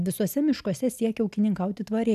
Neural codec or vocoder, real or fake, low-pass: none; real; 14.4 kHz